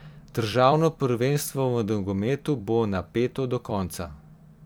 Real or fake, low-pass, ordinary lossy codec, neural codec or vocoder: fake; none; none; vocoder, 44.1 kHz, 128 mel bands every 256 samples, BigVGAN v2